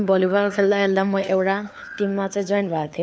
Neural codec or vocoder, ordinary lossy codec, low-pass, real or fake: codec, 16 kHz, 4 kbps, FunCodec, trained on LibriTTS, 50 frames a second; none; none; fake